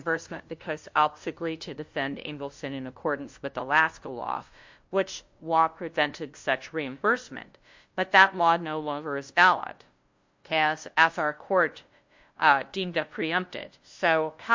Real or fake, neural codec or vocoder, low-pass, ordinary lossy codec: fake; codec, 16 kHz, 0.5 kbps, FunCodec, trained on LibriTTS, 25 frames a second; 7.2 kHz; MP3, 48 kbps